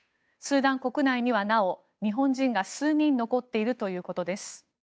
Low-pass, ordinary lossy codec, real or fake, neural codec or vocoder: none; none; fake; codec, 16 kHz, 8 kbps, FunCodec, trained on Chinese and English, 25 frames a second